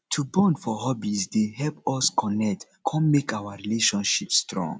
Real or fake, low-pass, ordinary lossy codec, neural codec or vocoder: real; none; none; none